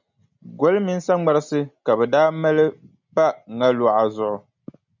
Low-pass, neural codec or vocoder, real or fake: 7.2 kHz; none; real